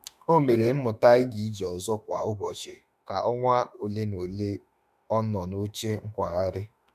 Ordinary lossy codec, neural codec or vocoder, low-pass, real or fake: none; autoencoder, 48 kHz, 32 numbers a frame, DAC-VAE, trained on Japanese speech; 14.4 kHz; fake